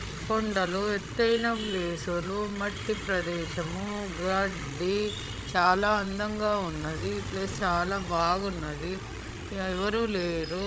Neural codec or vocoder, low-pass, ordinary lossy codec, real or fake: codec, 16 kHz, 16 kbps, FreqCodec, larger model; none; none; fake